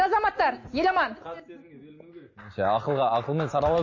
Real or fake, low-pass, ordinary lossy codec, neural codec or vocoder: real; 7.2 kHz; MP3, 32 kbps; none